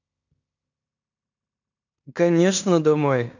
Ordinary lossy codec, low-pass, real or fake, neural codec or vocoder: none; 7.2 kHz; fake; codec, 16 kHz in and 24 kHz out, 0.9 kbps, LongCat-Audio-Codec, fine tuned four codebook decoder